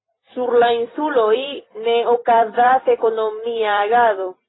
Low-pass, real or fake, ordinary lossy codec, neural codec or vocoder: 7.2 kHz; fake; AAC, 16 kbps; vocoder, 44.1 kHz, 128 mel bands every 256 samples, BigVGAN v2